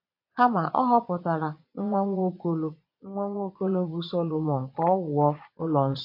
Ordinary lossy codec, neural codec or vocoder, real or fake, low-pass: MP3, 32 kbps; vocoder, 22.05 kHz, 80 mel bands, Vocos; fake; 5.4 kHz